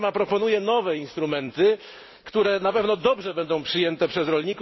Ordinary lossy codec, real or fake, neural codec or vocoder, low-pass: MP3, 24 kbps; real; none; 7.2 kHz